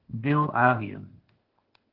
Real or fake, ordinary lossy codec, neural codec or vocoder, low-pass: fake; Opus, 16 kbps; codec, 16 kHz, 0.8 kbps, ZipCodec; 5.4 kHz